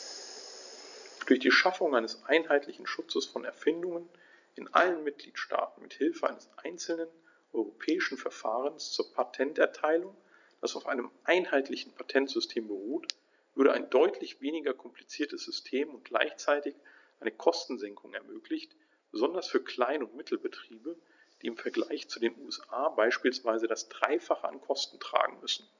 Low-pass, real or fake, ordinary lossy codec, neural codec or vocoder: 7.2 kHz; real; none; none